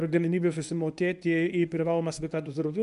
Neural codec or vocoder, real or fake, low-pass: codec, 24 kHz, 0.9 kbps, WavTokenizer, medium speech release version 1; fake; 10.8 kHz